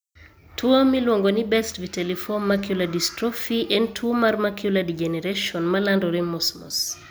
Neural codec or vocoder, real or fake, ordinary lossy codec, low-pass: none; real; none; none